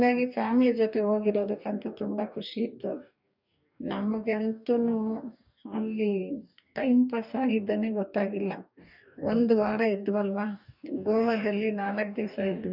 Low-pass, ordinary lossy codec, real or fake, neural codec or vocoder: 5.4 kHz; none; fake; codec, 44.1 kHz, 2.6 kbps, DAC